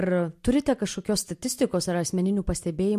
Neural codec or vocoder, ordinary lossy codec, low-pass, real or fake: none; MP3, 64 kbps; 14.4 kHz; real